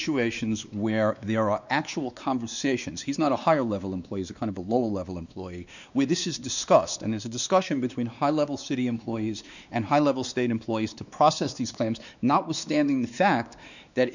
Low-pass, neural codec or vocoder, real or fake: 7.2 kHz; codec, 16 kHz, 2 kbps, X-Codec, WavLM features, trained on Multilingual LibriSpeech; fake